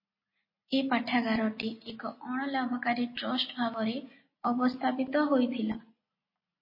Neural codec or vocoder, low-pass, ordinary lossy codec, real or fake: none; 5.4 kHz; MP3, 24 kbps; real